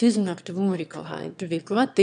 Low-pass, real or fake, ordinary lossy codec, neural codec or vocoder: 9.9 kHz; fake; AAC, 96 kbps; autoencoder, 22.05 kHz, a latent of 192 numbers a frame, VITS, trained on one speaker